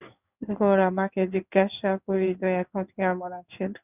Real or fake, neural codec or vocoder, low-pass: fake; codec, 16 kHz in and 24 kHz out, 1 kbps, XY-Tokenizer; 3.6 kHz